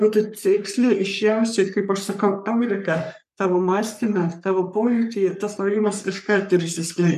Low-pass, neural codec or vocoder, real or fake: 14.4 kHz; codec, 44.1 kHz, 3.4 kbps, Pupu-Codec; fake